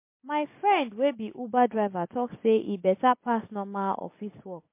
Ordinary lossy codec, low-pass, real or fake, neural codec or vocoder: MP3, 24 kbps; 3.6 kHz; real; none